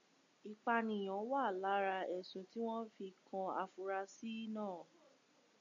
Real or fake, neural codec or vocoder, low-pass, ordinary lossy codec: real; none; 7.2 kHz; AAC, 64 kbps